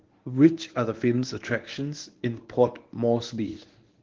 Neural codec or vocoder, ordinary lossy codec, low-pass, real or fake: codec, 24 kHz, 0.9 kbps, WavTokenizer, medium speech release version 1; Opus, 16 kbps; 7.2 kHz; fake